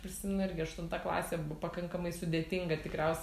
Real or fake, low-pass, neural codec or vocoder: real; 14.4 kHz; none